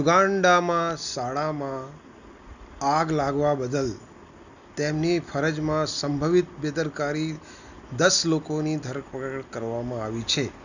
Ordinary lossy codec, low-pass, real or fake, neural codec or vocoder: none; 7.2 kHz; real; none